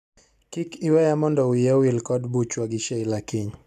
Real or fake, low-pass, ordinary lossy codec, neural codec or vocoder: real; 14.4 kHz; none; none